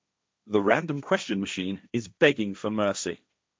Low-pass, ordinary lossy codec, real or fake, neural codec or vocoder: none; none; fake; codec, 16 kHz, 1.1 kbps, Voila-Tokenizer